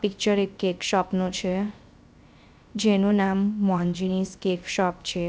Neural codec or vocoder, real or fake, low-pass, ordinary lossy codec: codec, 16 kHz, 0.3 kbps, FocalCodec; fake; none; none